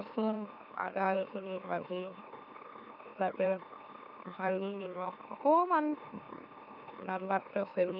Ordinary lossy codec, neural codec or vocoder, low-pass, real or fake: none; autoencoder, 44.1 kHz, a latent of 192 numbers a frame, MeloTTS; 5.4 kHz; fake